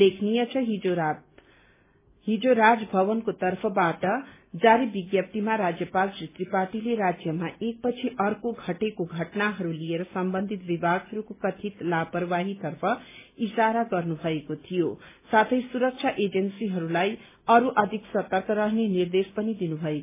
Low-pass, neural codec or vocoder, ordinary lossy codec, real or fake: 3.6 kHz; none; MP3, 16 kbps; real